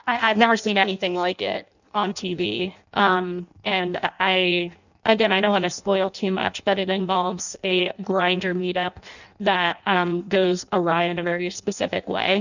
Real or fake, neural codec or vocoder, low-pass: fake; codec, 16 kHz in and 24 kHz out, 0.6 kbps, FireRedTTS-2 codec; 7.2 kHz